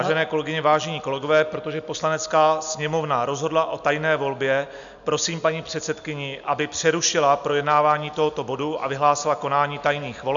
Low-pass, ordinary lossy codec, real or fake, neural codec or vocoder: 7.2 kHz; MP3, 96 kbps; real; none